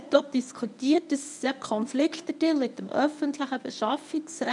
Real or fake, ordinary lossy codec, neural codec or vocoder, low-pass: fake; none; codec, 24 kHz, 0.9 kbps, WavTokenizer, medium speech release version 1; 10.8 kHz